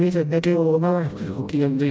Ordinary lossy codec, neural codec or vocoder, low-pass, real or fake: none; codec, 16 kHz, 0.5 kbps, FreqCodec, smaller model; none; fake